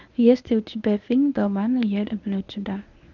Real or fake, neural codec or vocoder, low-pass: fake; codec, 24 kHz, 0.9 kbps, WavTokenizer, medium speech release version 1; 7.2 kHz